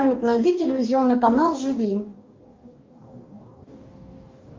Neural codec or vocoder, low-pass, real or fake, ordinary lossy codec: codec, 44.1 kHz, 2.6 kbps, DAC; 7.2 kHz; fake; Opus, 32 kbps